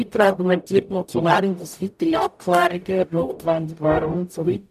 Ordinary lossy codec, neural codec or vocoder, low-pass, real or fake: none; codec, 44.1 kHz, 0.9 kbps, DAC; 14.4 kHz; fake